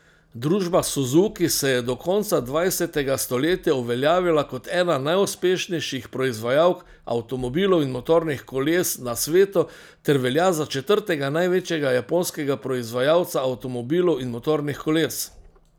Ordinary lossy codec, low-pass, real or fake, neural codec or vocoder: none; none; real; none